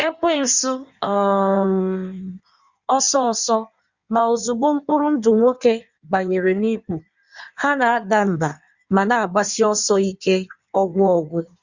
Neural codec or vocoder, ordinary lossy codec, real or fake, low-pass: codec, 16 kHz in and 24 kHz out, 1.1 kbps, FireRedTTS-2 codec; Opus, 64 kbps; fake; 7.2 kHz